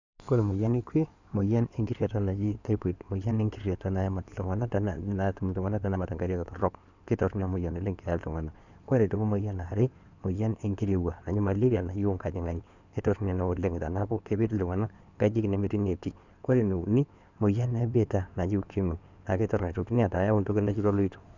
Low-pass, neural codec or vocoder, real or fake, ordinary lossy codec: 7.2 kHz; codec, 16 kHz in and 24 kHz out, 2.2 kbps, FireRedTTS-2 codec; fake; none